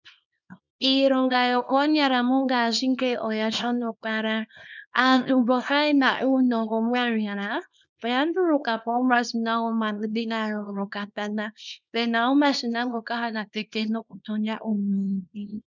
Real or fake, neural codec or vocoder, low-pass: fake; codec, 24 kHz, 0.9 kbps, WavTokenizer, small release; 7.2 kHz